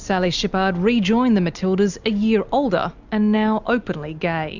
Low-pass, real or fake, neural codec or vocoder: 7.2 kHz; real; none